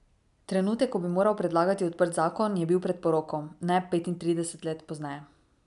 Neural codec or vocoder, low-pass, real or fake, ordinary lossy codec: none; 10.8 kHz; real; none